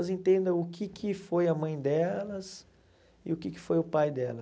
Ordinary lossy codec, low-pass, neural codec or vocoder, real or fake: none; none; none; real